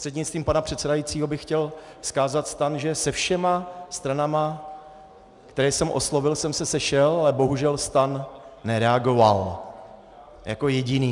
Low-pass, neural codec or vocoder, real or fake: 10.8 kHz; none; real